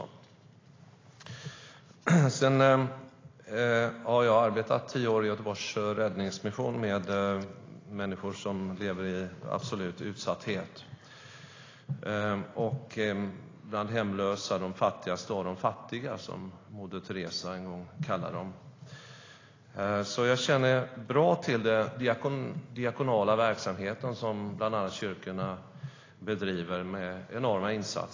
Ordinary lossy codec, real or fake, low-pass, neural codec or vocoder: AAC, 32 kbps; real; 7.2 kHz; none